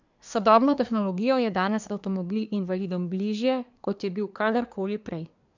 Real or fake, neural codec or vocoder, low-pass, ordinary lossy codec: fake; codec, 24 kHz, 1 kbps, SNAC; 7.2 kHz; none